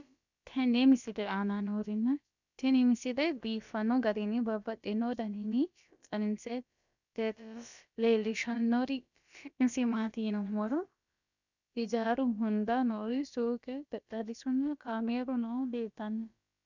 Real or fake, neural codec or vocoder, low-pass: fake; codec, 16 kHz, about 1 kbps, DyCAST, with the encoder's durations; 7.2 kHz